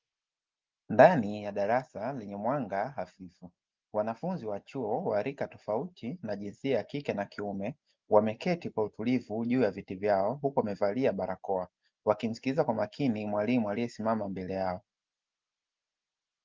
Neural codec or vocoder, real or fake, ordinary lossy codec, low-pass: none; real; Opus, 24 kbps; 7.2 kHz